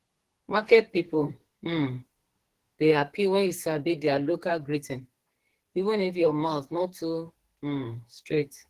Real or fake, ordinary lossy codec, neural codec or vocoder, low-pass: fake; Opus, 16 kbps; codec, 44.1 kHz, 2.6 kbps, SNAC; 14.4 kHz